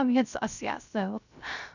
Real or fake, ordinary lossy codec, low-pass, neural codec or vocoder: fake; none; 7.2 kHz; codec, 16 kHz, 0.3 kbps, FocalCodec